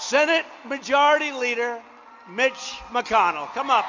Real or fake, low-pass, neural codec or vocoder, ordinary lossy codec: real; 7.2 kHz; none; MP3, 48 kbps